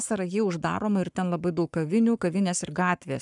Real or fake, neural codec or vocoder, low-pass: fake; codec, 44.1 kHz, 7.8 kbps, Pupu-Codec; 10.8 kHz